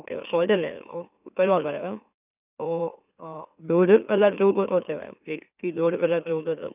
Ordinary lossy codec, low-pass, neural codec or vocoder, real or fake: none; 3.6 kHz; autoencoder, 44.1 kHz, a latent of 192 numbers a frame, MeloTTS; fake